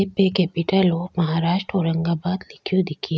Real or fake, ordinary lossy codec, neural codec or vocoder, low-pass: real; none; none; none